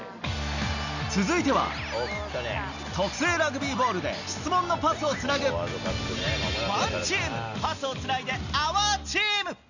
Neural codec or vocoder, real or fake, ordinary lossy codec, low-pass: none; real; none; 7.2 kHz